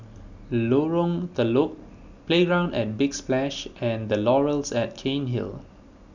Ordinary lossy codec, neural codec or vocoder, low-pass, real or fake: none; none; 7.2 kHz; real